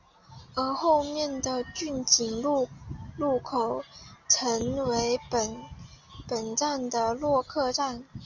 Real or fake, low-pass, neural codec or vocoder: real; 7.2 kHz; none